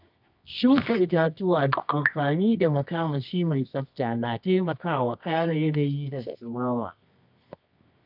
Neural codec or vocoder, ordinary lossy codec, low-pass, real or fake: codec, 24 kHz, 0.9 kbps, WavTokenizer, medium music audio release; none; 5.4 kHz; fake